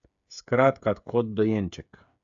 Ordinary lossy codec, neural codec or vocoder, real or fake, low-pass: AAC, 64 kbps; codec, 16 kHz, 8 kbps, FreqCodec, smaller model; fake; 7.2 kHz